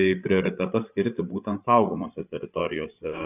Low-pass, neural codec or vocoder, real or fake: 3.6 kHz; codec, 16 kHz, 8 kbps, FreqCodec, larger model; fake